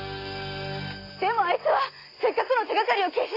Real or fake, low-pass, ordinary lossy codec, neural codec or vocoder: real; 5.4 kHz; AAC, 24 kbps; none